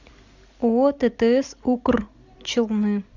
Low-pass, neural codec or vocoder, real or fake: 7.2 kHz; none; real